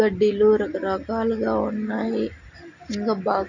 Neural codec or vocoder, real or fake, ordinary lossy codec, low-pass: none; real; none; 7.2 kHz